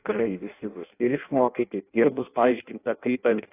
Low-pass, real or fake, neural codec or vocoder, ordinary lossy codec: 3.6 kHz; fake; codec, 16 kHz in and 24 kHz out, 0.6 kbps, FireRedTTS-2 codec; AAC, 24 kbps